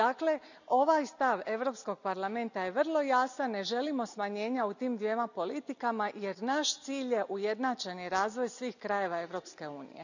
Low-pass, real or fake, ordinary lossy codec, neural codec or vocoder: 7.2 kHz; real; none; none